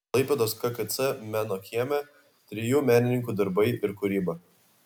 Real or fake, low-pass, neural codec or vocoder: real; 19.8 kHz; none